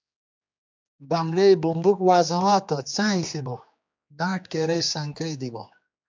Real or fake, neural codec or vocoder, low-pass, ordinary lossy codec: fake; codec, 16 kHz, 2 kbps, X-Codec, HuBERT features, trained on general audio; 7.2 kHz; MP3, 64 kbps